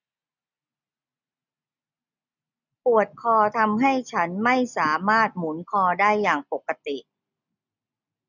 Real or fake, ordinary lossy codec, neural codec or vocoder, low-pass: real; none; none; 7.2 kHz